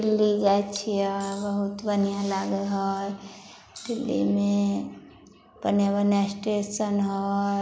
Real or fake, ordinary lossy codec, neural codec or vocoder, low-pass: real; none; none; none